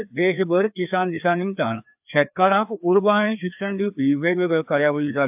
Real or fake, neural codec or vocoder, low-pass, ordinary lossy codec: fake; codec, 16 kHz, 2 kbps, FreqCodec, larger model; 3.6 kHz; AAC, 32 kbps